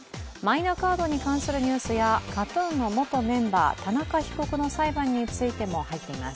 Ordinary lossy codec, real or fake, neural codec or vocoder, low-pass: none; real; none; none